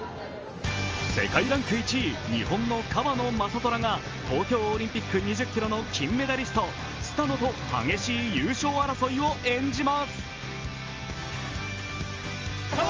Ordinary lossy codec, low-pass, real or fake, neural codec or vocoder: Opus, 24 kbps; 7.2 kHz; real; none